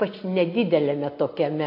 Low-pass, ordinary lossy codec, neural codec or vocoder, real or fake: 5.4 kHz; MP3, 32 kbps; none; real